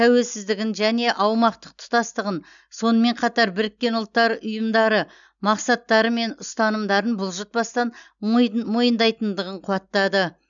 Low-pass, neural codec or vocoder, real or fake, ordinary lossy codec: 7.2 kHz; none; real; none